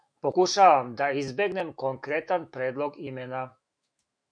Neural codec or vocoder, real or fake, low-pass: autoencoder, 48 kHz, 128 numbers a frame, DAC-VAE, trained on Japanese speech; fake; 9.9 kHz